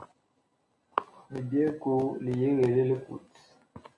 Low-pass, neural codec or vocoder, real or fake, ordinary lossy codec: 10.8 kHz; none; real; AAC, 48 kbps